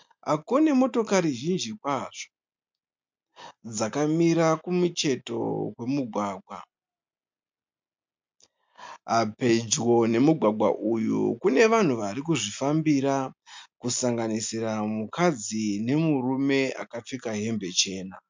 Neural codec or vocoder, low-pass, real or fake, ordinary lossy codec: none; 7.2 kHz; real; MP3, 64 kbps